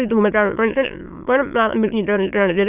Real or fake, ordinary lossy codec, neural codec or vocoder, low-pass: fake; none; autoencoder, 22.05 kHz, a latent of 192 numbers a frame, VITS, trained on many speakers; 3.6 kHz